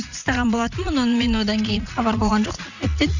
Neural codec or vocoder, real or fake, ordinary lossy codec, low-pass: vocoder, 44.1 kHz, 80 mel bands, Vocos; fake; none; 7.2 kHz